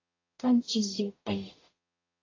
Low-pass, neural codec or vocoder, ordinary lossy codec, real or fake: 7.2 kHz; codec, 44.1 kHz, 0.9 kbps, DAC; AAC, 32 kbps; fake